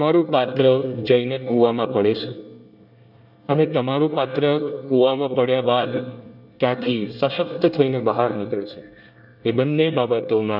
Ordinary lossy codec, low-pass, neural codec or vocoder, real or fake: none; 5.4 kHz; codec, 24 kHz, 1 kbps, SNAC; fake